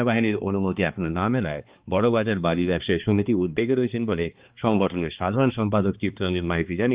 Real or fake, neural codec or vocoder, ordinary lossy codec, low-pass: fake; codec, 16 kHz, 2 kbps, X-Codec, HuBERT features, trained on balanced general audio; Opus, 32 kbps; 3.6 kHz